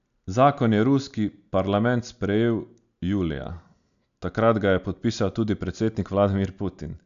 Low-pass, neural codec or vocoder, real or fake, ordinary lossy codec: 7.2 kHz; none; real; none